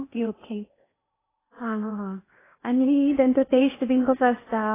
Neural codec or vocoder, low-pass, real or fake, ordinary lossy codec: codec, 16 kHz in and 24 kHz out, 0.8 kbps, FocalCodec, streaming, 65536 codes; 3.6 kHz; fake; AAC, 16 kbps